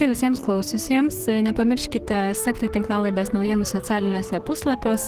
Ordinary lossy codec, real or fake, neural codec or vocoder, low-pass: Opus, 16 kbps; fake; codec, 44.1 kHz, 2.6 kbps, SNAC; 14.4 kHz